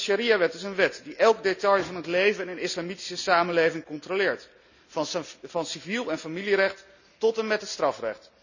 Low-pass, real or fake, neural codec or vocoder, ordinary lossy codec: 7.2 kHz; real; none; none